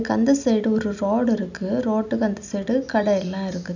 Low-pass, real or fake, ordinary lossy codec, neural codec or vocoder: 7.2 kHz; real; none; none